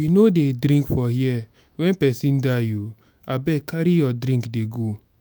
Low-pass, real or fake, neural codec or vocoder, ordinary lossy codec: none; fake; autoencoder, 48 kHz, 128 numbers a frame, DAC-VAE, trained on Japanese speech; none